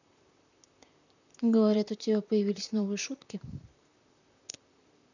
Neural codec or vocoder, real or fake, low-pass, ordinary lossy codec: vocoder, 44.1 kHz, 128 mel bands, Pupu-Vocoder; fake; 7.2 kHz; none